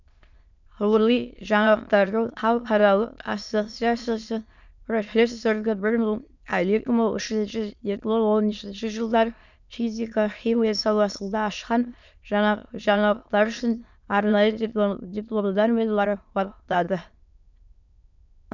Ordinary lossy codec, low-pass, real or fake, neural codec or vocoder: none; 7.2 kHz; fake; autoencoder, 22.05 kHz, a latent of 192 numbers a frame, VITS, trained on many speakers